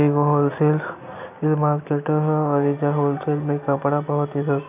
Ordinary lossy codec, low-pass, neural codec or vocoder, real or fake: none; 3.6 kHz; none; real